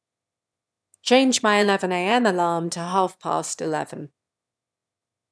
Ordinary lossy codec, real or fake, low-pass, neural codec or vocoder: none; fake; none; autoencoder, 22.05 kHz, a latent of 192 numbers a frame, VITS, trained on one speaker